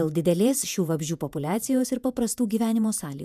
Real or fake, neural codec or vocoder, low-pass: fake; vocoder, 48 kHz, 128 mel bands, Vocos; 14.4 kHz